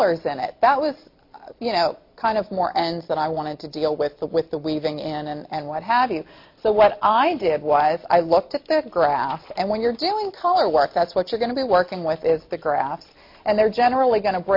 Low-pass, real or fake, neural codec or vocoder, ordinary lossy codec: 5.4 kHz; real; none; MP3, 32 kbps